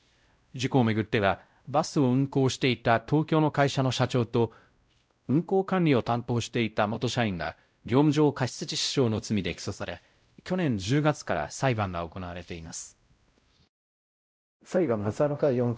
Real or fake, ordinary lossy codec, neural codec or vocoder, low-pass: fake; none; codec, 16 kHz, 0.5 kbps, X-Codec, WavLM features, trained on Multilingual LibriSpeech; none